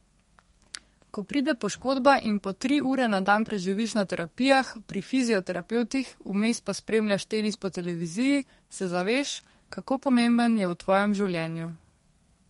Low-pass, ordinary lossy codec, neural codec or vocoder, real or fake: 14.4 kHz; MP3, 48 kbps; codec, 32 kHz, 1.9 kbps, SNAC; fake